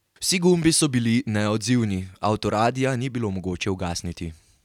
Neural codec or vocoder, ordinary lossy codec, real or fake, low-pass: none; none; real; 19.8 kHz